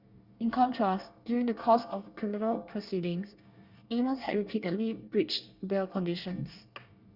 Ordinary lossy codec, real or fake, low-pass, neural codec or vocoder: Opus, 64 kbps; fake; 5.4 kHz; codec, 24 kHz, 1 kbps, SNAC